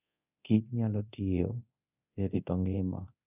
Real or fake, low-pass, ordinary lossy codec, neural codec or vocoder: fake; 3.6 kHz; none; codec, 24 kHz, 0.9 kbps, DualCodec